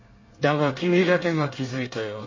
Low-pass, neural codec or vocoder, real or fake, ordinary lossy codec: 7.2 kHz; codec, 24 kHz, 1 kbps, SNAC; fake; MP3, 32 kbps